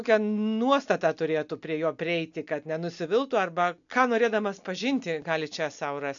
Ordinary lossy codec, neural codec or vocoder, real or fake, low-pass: MP3, 96 kbps; none; real; 7.2 kHz